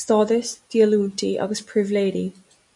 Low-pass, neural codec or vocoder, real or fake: 10.8 kHz; none; real